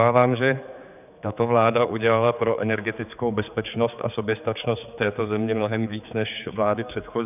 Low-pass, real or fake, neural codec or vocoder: 3.6 kHz; fake; codec, 16 kHz, 4 kbps, X-Codec, HuBERT features, trained on general audio